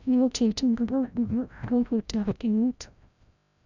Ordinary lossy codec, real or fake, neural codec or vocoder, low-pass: none; fake; codec, 16 kHz, 0.5 kbps, FreqCodec, larger model; 7.2 kHz